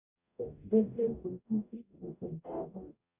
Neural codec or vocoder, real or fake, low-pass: codec, 44.1 kHz, 0.9 kbps, DAC; fake; 3.6 kHz